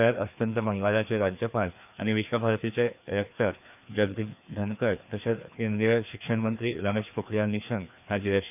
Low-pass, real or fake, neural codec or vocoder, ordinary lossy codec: 3.6 kHz; fake; codec, 16 kHz, 2 kbps, FreqCodec, larger model; none